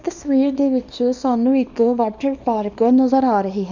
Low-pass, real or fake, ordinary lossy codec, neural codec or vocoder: 7.2 kHz; fake; none; codec, 24 kHz, 0.9 kbps, WavTokenizer, small release